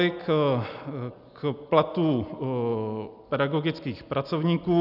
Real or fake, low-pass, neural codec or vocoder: real; 5.4 kHz; none